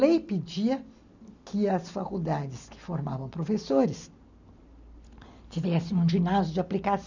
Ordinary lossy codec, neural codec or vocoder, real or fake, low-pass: none; none; real; 7.2 kHz